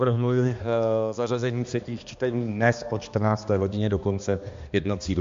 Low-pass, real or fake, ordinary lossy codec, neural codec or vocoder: 7.2 kHz; fake; MP3, 48 kbps; codec, 16 kHz, 2 kbps, X-Codec, HuBERT features, trained on balanced general audio